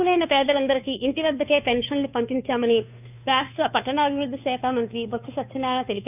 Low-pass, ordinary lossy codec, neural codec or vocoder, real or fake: 3.6 kHz; none; codec, 16 kHz, 2 kbps, FunCodec, trained on Chinese and English, 25 frames a second; fake